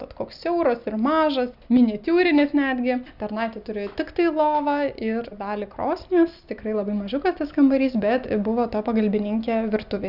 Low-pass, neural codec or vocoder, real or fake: 5.4 kHz; none; real